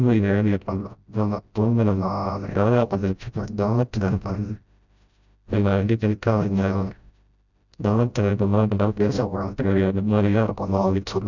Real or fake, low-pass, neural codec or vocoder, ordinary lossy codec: fake; 7.2 kHz; codec, 16 kHz, 0.5 kbps, FreqCodec, smaller model; none